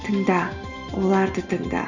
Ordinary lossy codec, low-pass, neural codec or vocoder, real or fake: none; 7.2 kHz; none; real